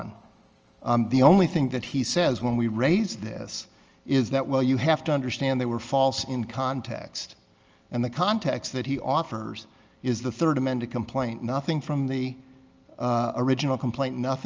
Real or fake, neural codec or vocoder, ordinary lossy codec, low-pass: real; none; Opus, 24 kbps; 7.2 kHz